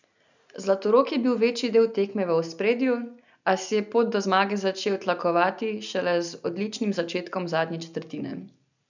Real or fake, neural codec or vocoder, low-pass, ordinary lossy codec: real; none; 7.2 kHz; none